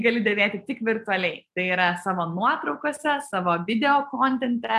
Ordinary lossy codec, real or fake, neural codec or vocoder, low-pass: AAC, 96 kbps; real; none; 14.4 kHz